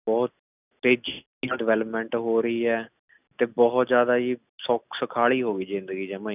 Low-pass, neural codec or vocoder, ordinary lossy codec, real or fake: 3.6 kHz; none; none; real